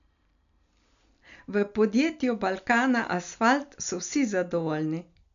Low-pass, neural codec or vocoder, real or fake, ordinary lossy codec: 7.2 kHz; none; real; none